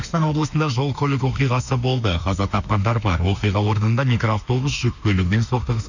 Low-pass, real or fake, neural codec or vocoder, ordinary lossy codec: 7.2 kHz; fake; autoencoder, 48 kHz, 32 numbers a frame, DAC-VAE, trained on Japanese speech; none